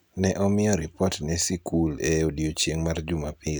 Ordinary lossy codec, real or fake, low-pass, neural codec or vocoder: none; real; none; none